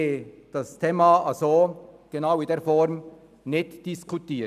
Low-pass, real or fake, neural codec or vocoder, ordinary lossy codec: 14.4 kHz; real; none; none